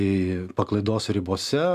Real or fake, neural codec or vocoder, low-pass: real; none; 14.4 kHz